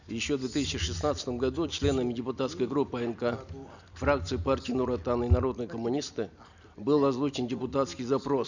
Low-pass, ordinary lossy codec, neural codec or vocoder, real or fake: 7.2 kHz; none; none; real